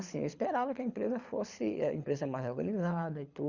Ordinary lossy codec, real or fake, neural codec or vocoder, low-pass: Opus, 64 kbps; fake; codec, 24 kHz, 6 kbps, HILCodec; 7.2 kHz